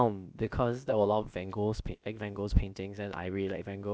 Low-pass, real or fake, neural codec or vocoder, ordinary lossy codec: none; fake; codec, 16 kHz, about 1 kbps, DyCAST, with the encoder's durations; none